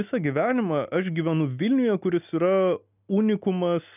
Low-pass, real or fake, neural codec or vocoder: 3.6 kHz; real; none